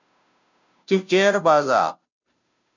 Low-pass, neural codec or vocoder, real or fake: 7.2 kHz; codec, 16 kHz, 0.5 kbps, FunCodec, trained on Chinese and English, 25 frames a second; fake